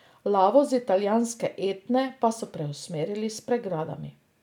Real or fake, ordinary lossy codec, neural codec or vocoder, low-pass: real; none; none; 19.8 kHz